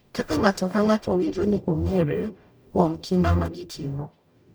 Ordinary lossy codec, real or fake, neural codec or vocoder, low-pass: none; fake; codec, 44.1 kHz, 0.9 kbps, DAC; none